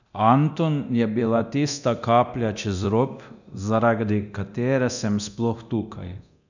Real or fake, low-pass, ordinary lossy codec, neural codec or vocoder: fake; 7.2 kHz; none; codec, 24 kHz, 0.9 kbps, DualCodec